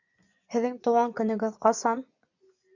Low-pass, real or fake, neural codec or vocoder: 7.2 kHz; fake; vocoder, 24 kHz, 100 mel bands, Vocos